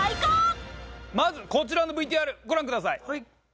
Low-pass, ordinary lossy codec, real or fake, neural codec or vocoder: none; none; real; none